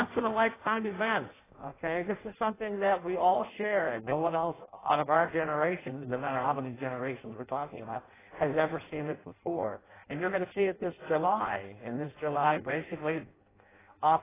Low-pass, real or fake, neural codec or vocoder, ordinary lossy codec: 3.6 kHz; fake; codec, 16 kHz in and 24 kHz out, 0.6 kbps, FireRedTTS-2 codec; AAC, 16 kbps